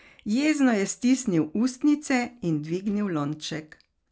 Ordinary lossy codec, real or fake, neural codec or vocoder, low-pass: none; real; none; none